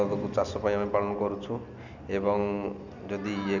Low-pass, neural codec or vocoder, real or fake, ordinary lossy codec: 7.2 kHz; none; real; none